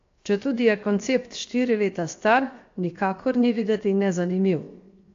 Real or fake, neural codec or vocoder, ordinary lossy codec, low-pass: fake; codec, 16 kHz, 0.7 kbps, FocalCodec; AAC, 48 kbps; 7.2 kHz